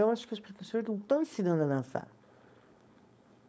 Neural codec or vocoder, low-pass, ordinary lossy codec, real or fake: codec, 16 kHz, 4.8 kbps, FACodec; none; none; fake